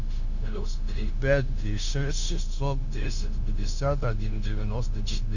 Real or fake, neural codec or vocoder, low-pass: fake; codec, 16 kHz, 0.9 kbps, LongCat-Audio-Codec; 7.2 kHz